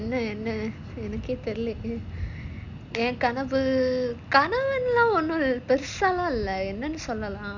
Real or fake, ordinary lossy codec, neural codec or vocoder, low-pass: real; none; none; 7.2 kHz